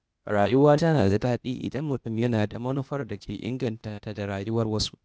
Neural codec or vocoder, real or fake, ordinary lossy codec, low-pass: codec, 16 kHz, 0.8 kbps, ZipCodec; fake; none; none